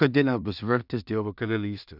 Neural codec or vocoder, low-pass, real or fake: codec, 16 kHz in and 24 kHz out, 0.4 kbps, LongCat-Audio-Codec, two codebook decoder; 5.4 kHz; fake